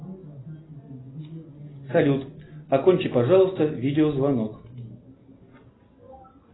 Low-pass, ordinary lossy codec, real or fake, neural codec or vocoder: 7.2 kHz; AAC, 16 kbps; real; none